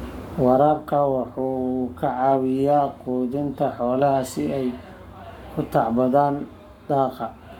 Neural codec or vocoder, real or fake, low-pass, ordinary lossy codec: codec, 44.1 kHz, 7.8 kbps, Pupu-Codec; fake; 19.8 kHz; none